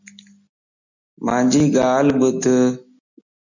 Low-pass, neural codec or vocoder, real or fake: 7.2 kHz; none; real